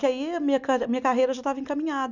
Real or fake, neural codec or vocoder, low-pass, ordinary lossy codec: real; none; 7.2 kHz; none